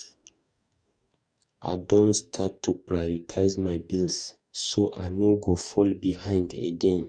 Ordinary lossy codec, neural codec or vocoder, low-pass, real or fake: none; codec, 44.1 kHz, 2.6 kbps, DAC; 9.9 kHz; fake